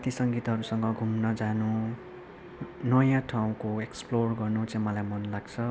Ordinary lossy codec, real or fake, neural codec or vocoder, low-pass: none; real; none; none